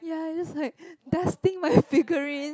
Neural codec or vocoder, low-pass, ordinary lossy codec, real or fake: none; none; none; real